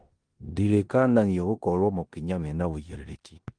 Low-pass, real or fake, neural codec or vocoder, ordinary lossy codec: 9.9 kHz; fake; codec, 16 kHz in and 24 kHz out, 0.9 kbps, LongCat-Audio-Codec, four codebook decoder; Opus, 24 kbps